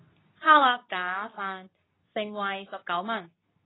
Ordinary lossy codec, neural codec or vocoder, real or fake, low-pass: AAC, 16 kbps; codec, 24 kHz, 0.9 kbps, WavTokenizer, medium speech release version 2; fake; 7.2 kHz